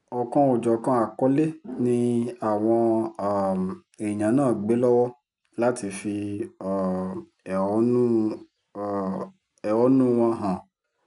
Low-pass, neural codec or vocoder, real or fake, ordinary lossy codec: 10.8 kHz; none; real; none